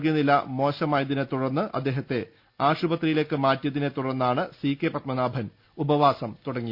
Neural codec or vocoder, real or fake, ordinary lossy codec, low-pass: none; real; Opus, 64 kbps; 5.4 kHz